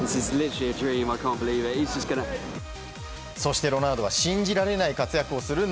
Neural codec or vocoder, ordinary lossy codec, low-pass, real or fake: none; none; none; real